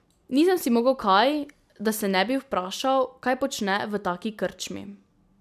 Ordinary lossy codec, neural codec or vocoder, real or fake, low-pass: none; none; real; 14.4 kHz